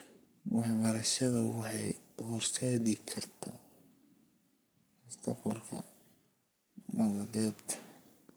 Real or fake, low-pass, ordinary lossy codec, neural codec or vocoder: fake; none; none; codec, 44.1 kHz, 3.4 kbps, Pupu-Codec